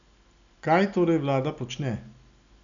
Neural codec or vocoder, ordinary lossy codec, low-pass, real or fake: none; none; 7.2 kHz; real